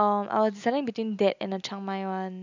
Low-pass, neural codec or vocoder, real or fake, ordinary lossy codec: 7.2 kHz; none; real; none